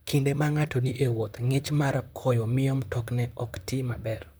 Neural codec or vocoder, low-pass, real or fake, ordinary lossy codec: vocoder, 44.1 kHz, 128 mel bands, Pupu-Vocoder; none; fake; none